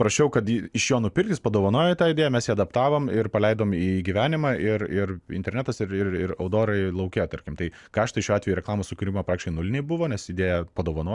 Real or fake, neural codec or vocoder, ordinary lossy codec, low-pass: real; none; Opus, 64 kbps; 10.8 kHz